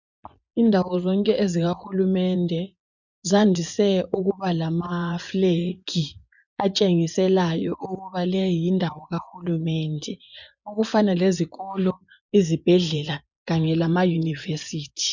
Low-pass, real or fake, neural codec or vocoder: 7.2 kHz; fake; vocoder, 24 kHz, 100 mel bands, Vocos